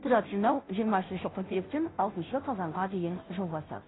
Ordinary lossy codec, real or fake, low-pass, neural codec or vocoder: AAC, 16 kbps; fake; 7.2 kHz; codec, 16 kHz, 0.5 kbps, FunCodec, trained on Chinese and English, 25 frames a second